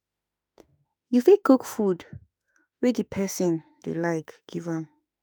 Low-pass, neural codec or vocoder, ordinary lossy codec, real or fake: none; autoencoder, 48 kHz, 32 numbers a frame, DAC-VAE, trained on Japanese speech; none; fake